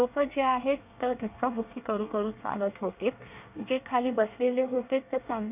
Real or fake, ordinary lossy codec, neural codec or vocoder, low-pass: fake; none; codec, 24 kHz, 1 kbps, SNAC; 3.6 kHz